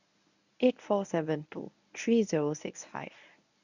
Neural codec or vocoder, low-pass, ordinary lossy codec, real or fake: codec, 24 kHz, 0.9 kbps, WavTokenizer, medium speech release version 1; 7.2 kHz; none; fake